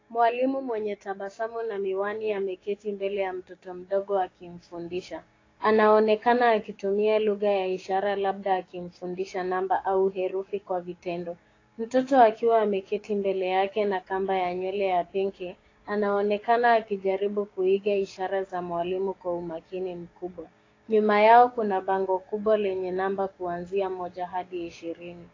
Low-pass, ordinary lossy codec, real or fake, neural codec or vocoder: 7.2 kHz; AAC, 32 kbps; fake; codec, 16 kHz, 6 kbps, DAC